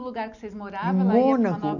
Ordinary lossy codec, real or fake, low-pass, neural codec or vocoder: none; real; 7.2 kHz; none